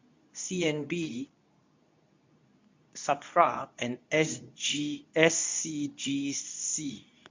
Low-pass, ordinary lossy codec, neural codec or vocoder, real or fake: 7.2 kHz; MP3, 64 kbps; codec, 24 kHz, 0.9 kbps, WavTokenizer, medium speech release version 2; fake